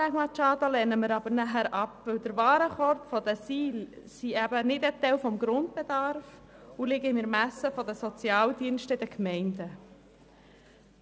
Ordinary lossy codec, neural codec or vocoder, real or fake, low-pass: none; none; real; none